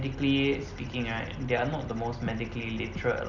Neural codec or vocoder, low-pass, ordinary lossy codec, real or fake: none; 7.2 kHz; none; real